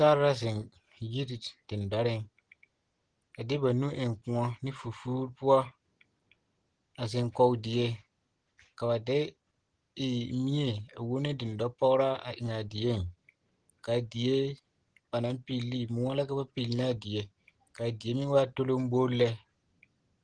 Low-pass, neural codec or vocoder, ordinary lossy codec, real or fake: 9.9 kHz; none; Opus, 16 kbps; real